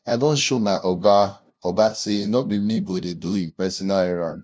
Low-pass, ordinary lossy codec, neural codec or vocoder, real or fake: none; none; codec, 16 kHz, 0.5 kbps, FunCodec, trained on LibriTTS, 25 frames a second; fake